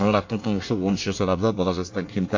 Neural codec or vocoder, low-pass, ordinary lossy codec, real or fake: codec, 24 kHz, 1 kbps, SNAC; 7.2 kHz; AAC, 48 kbps; fake